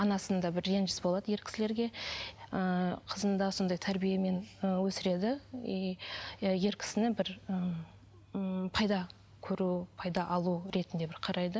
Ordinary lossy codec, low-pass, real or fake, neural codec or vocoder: none; none; real; none